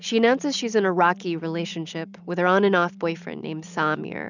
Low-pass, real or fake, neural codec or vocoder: 7.2 kHz; real; none